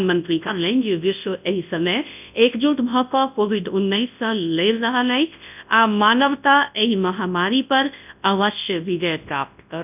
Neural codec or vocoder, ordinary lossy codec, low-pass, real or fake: codec, 24 kHz, 0.9 kbps, WavTokenizer, large speech release; none; 3.6 kHz; fake